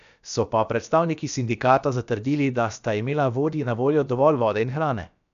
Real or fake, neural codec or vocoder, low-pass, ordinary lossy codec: fake; codec, 16 kHz, about 1 kbps, DyCAST, with the encoder's durations; 7.2 kHz; none